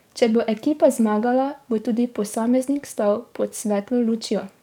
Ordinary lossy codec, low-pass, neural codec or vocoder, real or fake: none; 19.8 kHz; codec, 44.1 kHz, 7.8 kbps, DAC; fake